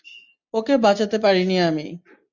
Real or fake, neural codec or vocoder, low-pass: real; none; 7.2 kHz